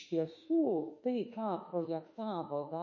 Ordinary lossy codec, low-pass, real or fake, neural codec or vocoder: MP3, 32 kbps; 7.2 kHz; fake; autoencoder, 48 kHz, 32 numbers a frame, DAC-VAE, trained on Japanese speech